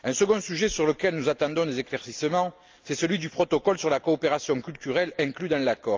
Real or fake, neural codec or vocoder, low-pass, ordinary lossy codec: real; none; 7.2 kHz; Opus, 24 kbps